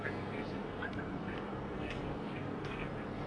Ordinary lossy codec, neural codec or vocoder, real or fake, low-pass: AAC, 32 kbps; codec, 44.1 kHz, 2.6 kbps, SNAC; fake; 9.9 kHz